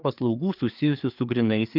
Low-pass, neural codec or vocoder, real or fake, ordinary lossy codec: 5.4 kHz; codec, 16 kHz, 4 kbps, FreqCodec, larger model; fake; Opus, 32 kbps